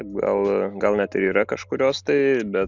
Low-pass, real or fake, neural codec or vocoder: 7.2 kHz; real; none